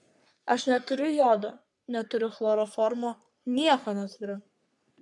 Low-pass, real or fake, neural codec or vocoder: 10.8 kHz; fake; codec, 44.1 kHz, 3.4 kbps, Pupu-Codec